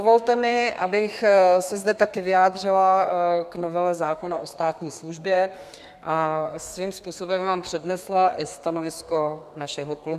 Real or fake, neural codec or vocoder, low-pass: fake; codec, 32 kHz, 1.9 kbps, SNAC; 14.4 kHz